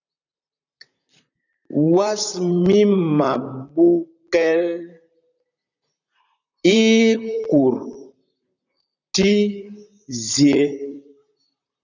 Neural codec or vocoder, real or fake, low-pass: vocoder, 44.1 kHz, 128 mel bands, Pupu-Vocoder; fake; 7.2 kHz